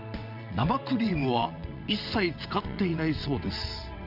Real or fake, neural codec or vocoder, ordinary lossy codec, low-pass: fake; vocoder, 22.05 kHz, 80 mel bands, WaveNeXt; none; 5.4 kHz